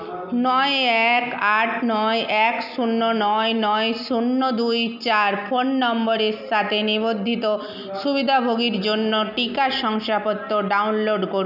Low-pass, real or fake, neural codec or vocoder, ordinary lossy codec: 5.4 kHz; real; none; none